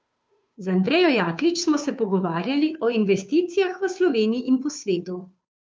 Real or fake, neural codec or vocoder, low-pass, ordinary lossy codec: fake; codec, 16 kHz, 2 kbps, FunCodec, trained on Chinese and English, 25 frames a second; none; none